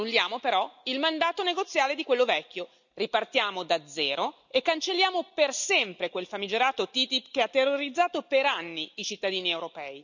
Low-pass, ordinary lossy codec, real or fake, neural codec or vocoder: 7.2 kHz; none; real; none